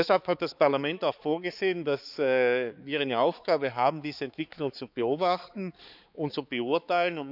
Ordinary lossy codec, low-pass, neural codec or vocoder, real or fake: none; 5.4 kHz; codec, 16 kHz, 4 kbps, X-Codec, HuBERT features, trained on balanced general audio; fake